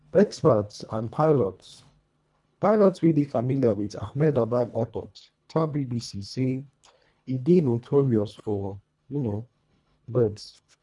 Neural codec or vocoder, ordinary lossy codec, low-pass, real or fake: codec, 24 kHz, 1.5 kbps, HILCodec; none; 10.8 kHz; fake